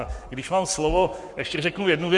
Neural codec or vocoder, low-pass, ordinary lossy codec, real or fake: codec, 44.1 kHz, 7.8 kbps, Pupu-Codec; 10.8 kHz; Opus, 64 kbps; fake